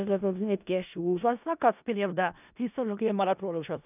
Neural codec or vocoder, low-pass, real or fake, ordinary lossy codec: codec, 16 kHz in and 24 kHz out, 0.4 kbps, LongCat-Audio-Codec, four codebook decoder; 3.6 kHz; fake; none